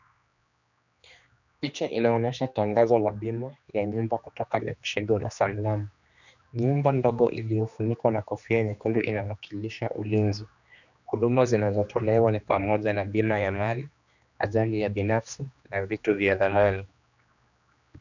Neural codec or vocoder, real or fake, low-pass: codec, 16 kHz, 2 kbps, X-Codec, HuBERT features, trained on general audio; fake; 7.2 kHz